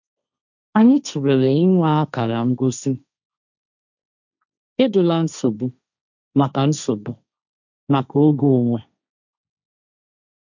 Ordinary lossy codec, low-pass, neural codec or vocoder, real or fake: none; 7.2 kHz; codec, 16 kHz, 1.1 kbps, Voila-Tokenizer; fake